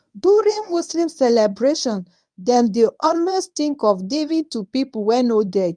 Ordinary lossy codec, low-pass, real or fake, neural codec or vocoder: none; 9.9 kHz; fake; codec, 24 kHz, 0.9 kbps, WavTokenizer, medium speech release version 1